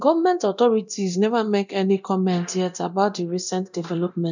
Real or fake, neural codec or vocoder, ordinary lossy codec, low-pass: fake; codec, 24 kHz, 0.9 kbps, DualCodec; none; 7.2 kHz